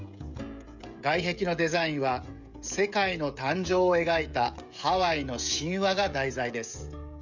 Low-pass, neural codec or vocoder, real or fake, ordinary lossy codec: 7.2 kHz; codec, 16 kHz, 16 kbps, FreqCodec, smaller model; fake; none